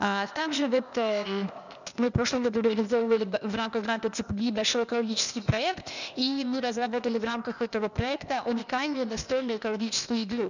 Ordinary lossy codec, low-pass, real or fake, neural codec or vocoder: none; 7.2 kHz; fake; codec, 16 kHz, 0.8 kbps, ZipCodec